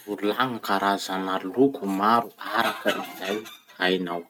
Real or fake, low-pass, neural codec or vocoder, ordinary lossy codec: real; none; none; none